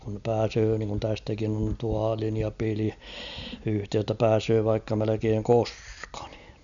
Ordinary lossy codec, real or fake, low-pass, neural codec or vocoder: none; real; 7.2 kHz; none